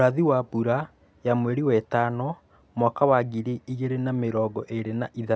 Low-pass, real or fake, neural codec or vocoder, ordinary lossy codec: none; real; none; none